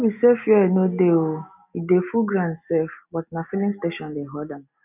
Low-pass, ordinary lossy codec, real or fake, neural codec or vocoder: 3.6 kHz; none; real; none